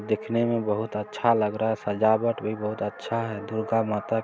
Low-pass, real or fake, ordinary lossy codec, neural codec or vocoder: none; real; none; none